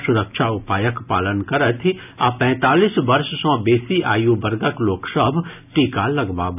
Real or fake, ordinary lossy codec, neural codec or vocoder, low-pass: real; none; none; 3.6 kHz